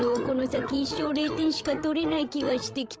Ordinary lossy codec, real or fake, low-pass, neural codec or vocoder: none; fake; none; codec, 16 kHz, 8 kbps, FreqCodec, larger model